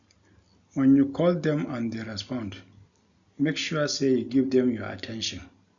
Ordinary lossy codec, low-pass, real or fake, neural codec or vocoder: none; 7.2 kHz; real; none